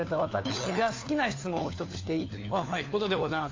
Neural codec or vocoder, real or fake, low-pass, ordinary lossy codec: codec, 16 kHz, 4 kbps, FunCodec, trained on LibriTTS, 50 frames a second; fake; 7.2 kHz; AAC, 32 kbps